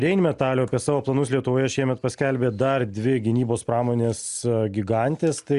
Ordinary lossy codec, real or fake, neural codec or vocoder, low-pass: Opus, 64 kbps; real; none; 10.8 kHz